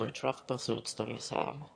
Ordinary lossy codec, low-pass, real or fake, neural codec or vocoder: AAC, 48 kbps; 9.9 kHz; fake; autoencoder, 22.05 kHz, a latent of 192 numbers a frame, VITS, trained on one speaker